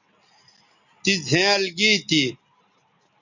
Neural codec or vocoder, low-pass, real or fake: none; 7.2 kHz; real